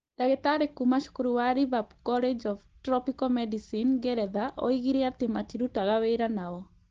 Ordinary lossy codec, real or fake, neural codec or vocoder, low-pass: Opus, 16 kbps; real; none; 7.2 kHz